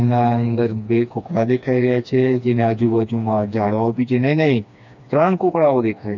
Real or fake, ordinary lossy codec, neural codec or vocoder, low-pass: fake; none; codec, 16 kHz, 2 kbps, FreqCodec, smaller model; 7.2 kHz